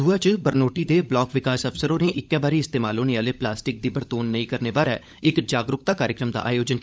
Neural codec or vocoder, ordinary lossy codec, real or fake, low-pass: codec, 16 kHz, 16 kbps, FunCodec, trained on LibriTTS, 50 frames a second; none; fake; none